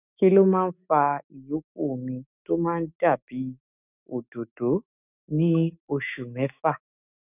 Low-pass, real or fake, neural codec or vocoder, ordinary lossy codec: 3.6 kHz; fake; autoencoder, 48 kHz, 128 numbers a frame, DAC-VAE, trained on Japanese speech; none